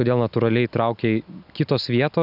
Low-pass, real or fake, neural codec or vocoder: 5.4 kHz; real; none